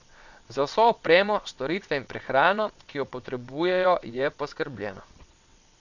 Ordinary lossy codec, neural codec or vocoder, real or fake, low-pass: none; vocoder, 22.05 kHz, 80 mel bands, WaveNeXt; fake; 7.2 kHz